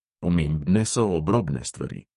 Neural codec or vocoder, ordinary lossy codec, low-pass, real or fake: codec, 44.1 kHz, 2.6 kbps, SNAC; MP3, 48 kbps; 14.4 kHz; fake